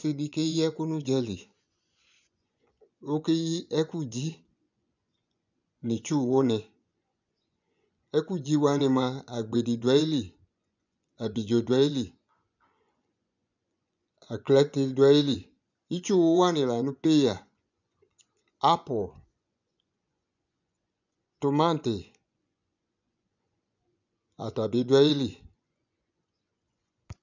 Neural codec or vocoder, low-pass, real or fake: vocoder, 24 kHz, 100 mel bands, Vocos; 7.2 kHz; fake